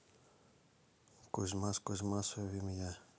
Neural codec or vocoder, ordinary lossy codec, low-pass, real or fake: none; none; none; real